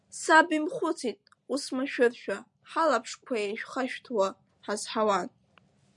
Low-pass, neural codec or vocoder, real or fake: 10.8 kHz; none; real